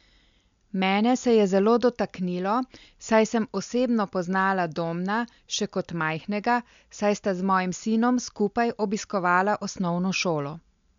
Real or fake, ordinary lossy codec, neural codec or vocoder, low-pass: real; MP3, 64 kbps; none; 7.2 kHz